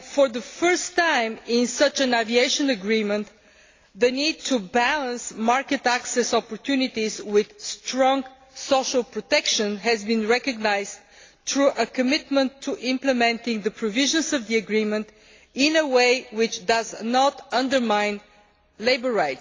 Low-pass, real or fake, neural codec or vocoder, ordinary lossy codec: 7.2 kHz; real; none; AAC, 32 kbps